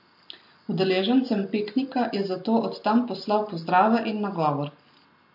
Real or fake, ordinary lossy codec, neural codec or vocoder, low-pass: real; MP3, 32 kbps; none; 5.4 kHz